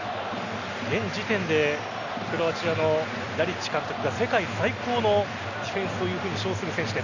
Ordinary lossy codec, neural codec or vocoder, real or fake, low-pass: none; none; real; 7.2 kHz